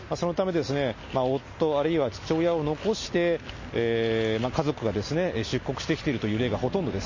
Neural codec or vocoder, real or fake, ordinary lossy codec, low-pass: none; real; MP3, 32 kbps; 7.2 kHz